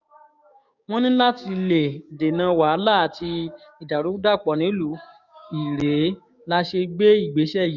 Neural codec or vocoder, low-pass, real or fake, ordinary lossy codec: codec, 16 kHz, 6 kbps, DAC; 7.2 kHz; fake; none